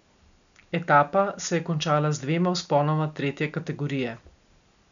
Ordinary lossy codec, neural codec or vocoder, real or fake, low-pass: none; none; real; 7.2 kHz